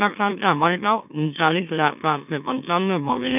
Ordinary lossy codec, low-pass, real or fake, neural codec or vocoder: none; 3.6 kHz; fake; autoencoder, 44.1 kHz, a latent of 192 numbers a frame, MeloTTS